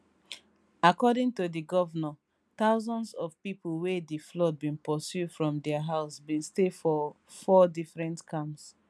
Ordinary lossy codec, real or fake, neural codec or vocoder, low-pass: none; real; none; none